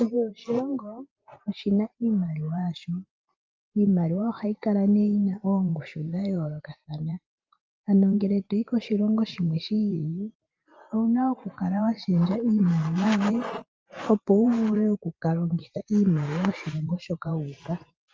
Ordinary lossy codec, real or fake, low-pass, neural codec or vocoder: Opus, 24 kbps; fake; 7.2 kHz; vocoder, 44.1 kHz, 128 mel bands every 512 samples, BigVGAN v2